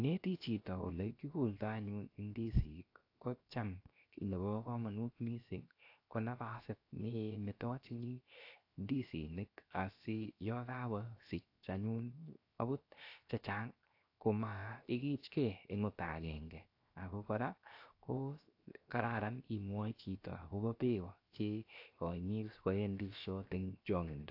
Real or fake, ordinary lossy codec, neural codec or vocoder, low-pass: fake; AAC, 32 kbps; codec, 16 kHz, 0.7 kbps, FocalCodec; 5.4 kHz